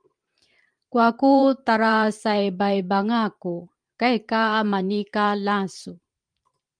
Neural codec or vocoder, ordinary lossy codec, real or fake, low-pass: vocoder, 44.1 kHz, 128 mel bands every 512 samples, BigVGAN v2; Opus, 32 kbps; fake; 9.9 kHz